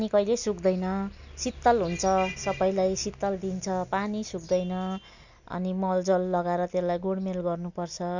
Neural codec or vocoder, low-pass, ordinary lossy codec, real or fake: none; 7.2 kHz; none; real